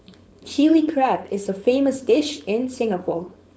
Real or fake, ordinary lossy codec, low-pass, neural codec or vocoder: fake; none; none; codec, 16 kHz, 4.8 kbps, FACodec